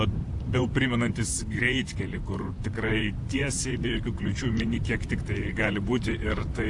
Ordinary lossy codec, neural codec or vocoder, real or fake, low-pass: AAC, 64 kbps; vocoder, 44.1 kHz, 128 mel bands, Pupu-Vocoder; fake; 10.8 kHz